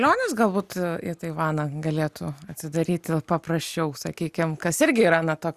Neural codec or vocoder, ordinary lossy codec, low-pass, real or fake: none; Opus, 64 kbps; 14.4 kHz; real